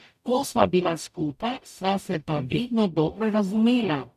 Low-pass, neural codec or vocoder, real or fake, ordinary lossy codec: 14.4 kHz; codec, 44.1 kHz, 0.9 kbps, DAC; fake; none